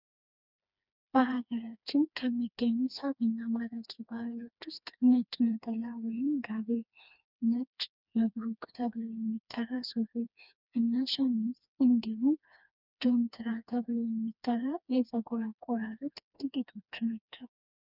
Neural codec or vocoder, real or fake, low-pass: codec, 16 kHz, 2 kbps, FreqCodec, smaller model; fake; 5.4 kHz